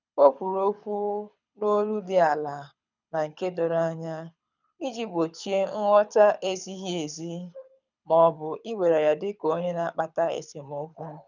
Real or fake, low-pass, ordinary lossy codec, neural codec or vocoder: fake; 7.2 kHz; none; codec, 24 kHz, 6 kbps, HILCodec